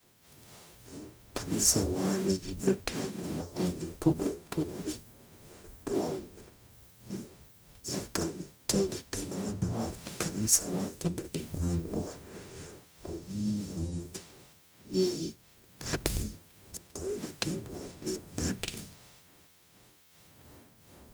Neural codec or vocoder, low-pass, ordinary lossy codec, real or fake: codec, 44.1 kHz, 0.9 kbps, DAC; none; none; fake